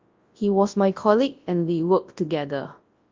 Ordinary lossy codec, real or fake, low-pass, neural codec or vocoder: Opus, 32 kbps; fake; 7.2 kHz; codec, 24 kHz, 0.9 kbps, WavTokenizer, large speech release